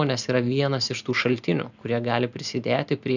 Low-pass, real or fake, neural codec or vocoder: 7.2 kHz; real; none